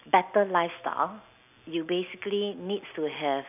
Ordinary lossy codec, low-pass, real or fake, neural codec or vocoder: none; 3.6 kHz; real; none